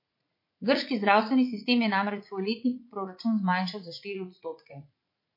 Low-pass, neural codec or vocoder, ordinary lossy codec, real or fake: 5.4 kHz; vocoder, 44.1 kHz, 80 mel bands, Vocos; MP3, 32 kbps; fake